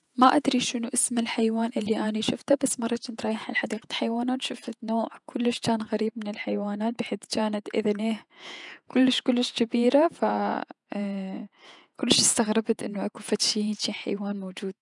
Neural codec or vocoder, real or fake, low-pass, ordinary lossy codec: vocoder, 48 kHz, 128 mel bands, Vocos; fake; 10.8 kHz; none